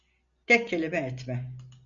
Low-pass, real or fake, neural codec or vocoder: 7.2 kHz; real; none